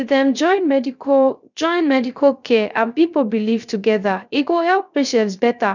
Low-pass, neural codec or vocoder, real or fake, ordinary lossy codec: 7.2 kHz; codec, 16 kHz, 0.3 kbps, FocalCodec; fake; none